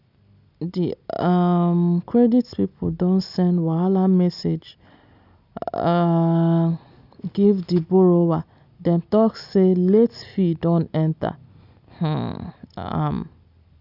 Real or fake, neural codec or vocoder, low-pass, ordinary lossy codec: real; none; 5.4 kHz; none